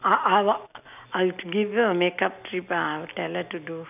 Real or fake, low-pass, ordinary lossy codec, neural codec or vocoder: real; 3.6 kHz; none; none